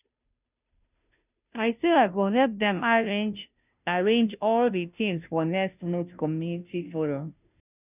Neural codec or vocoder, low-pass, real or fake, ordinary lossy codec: codec, 16 kHz, 0.5 kbps, FunCodec, trained on Chinese and English, 25 frames a second; 3.6 kHz; fake; none